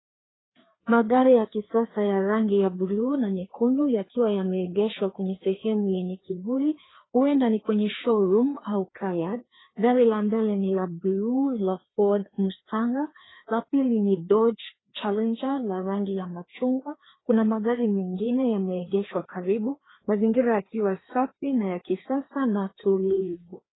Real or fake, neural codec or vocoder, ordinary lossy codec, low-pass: fake; codec, 16 kHz, 2 kbps, FreqCodec, larger model; AAC, 16 kbps; 7.2 kHz